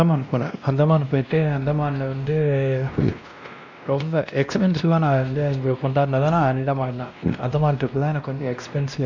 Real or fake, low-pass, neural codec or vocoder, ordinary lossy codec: fake; 7.2 kHz; codec, 16 kHz, 1 kbps, X-Codec, WavLM features, trained on Multilingual LibriSpeech; none